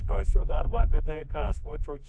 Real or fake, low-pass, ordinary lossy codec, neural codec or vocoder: fake; 9.9 kHz; AAC, 64 kbps; codec, 24 kHz, 0.9 kbps, WavTokenizer, medium music audio release